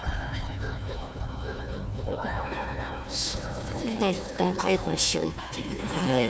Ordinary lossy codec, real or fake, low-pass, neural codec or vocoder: none; fake; none; codec, 16 kHz, 1 kbps, FunCodec, trained on Chinese and English, 50 frames a second